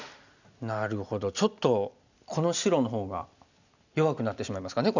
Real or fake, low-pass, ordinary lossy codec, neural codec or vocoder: real; 7.2 kHz; none; none